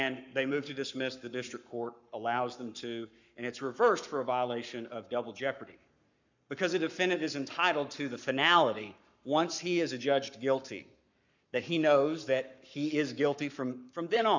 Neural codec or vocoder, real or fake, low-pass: codec, 44.1 kHz, 7.8 kbps, Pupu-Codec; fake; 7.2 kHz